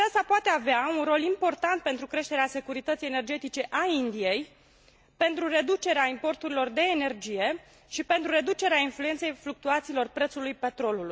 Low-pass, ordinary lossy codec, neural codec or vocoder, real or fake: none; none; none; real